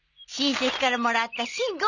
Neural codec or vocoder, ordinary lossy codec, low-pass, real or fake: none; none; 7.2 kHz; real